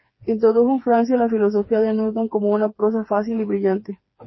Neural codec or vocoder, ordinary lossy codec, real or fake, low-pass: codec, 16 kHz, 4 kbps, FreqCodec, smaller model; MP3, 24 kbps; fake; 7.2 kHz